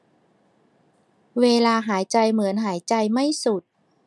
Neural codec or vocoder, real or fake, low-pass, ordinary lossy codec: none; real; none; none